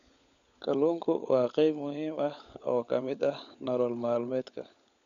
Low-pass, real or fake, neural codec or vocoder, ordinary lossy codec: 7.2 kHz; fake; codec, 16 kHz, 16 kbps, FunCodec, trained on LibriTTS, 50 frames a second; none